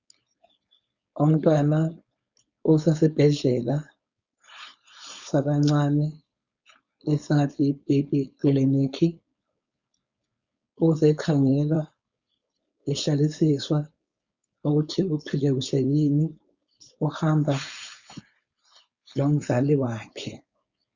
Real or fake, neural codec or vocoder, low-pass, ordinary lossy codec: fake; codec, 16 kHz, 4.8 kbps, FACodec; 7.2 kHz; Opus, 64 kbps